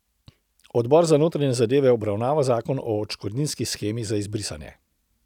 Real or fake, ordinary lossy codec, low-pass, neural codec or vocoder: real; none; 19.8 kHz; none